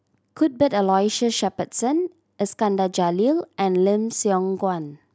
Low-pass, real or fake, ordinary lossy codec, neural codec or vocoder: none; real; none; none